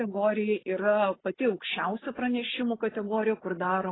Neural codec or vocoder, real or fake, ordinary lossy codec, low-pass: none; real; AAC, 16 kbps; 7.2 kHz